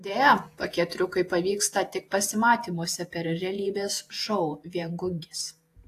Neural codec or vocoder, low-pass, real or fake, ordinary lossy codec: vocoder, 48 kHz, 128 mel bands, Vocos; 14.4 kHz; fake; AAC, 64 kbps